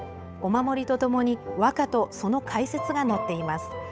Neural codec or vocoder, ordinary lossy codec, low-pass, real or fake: codec, 16 kHz, 8 kbps, FunCodec, trained on Chinese and English, 25 frames a second; none; none; fake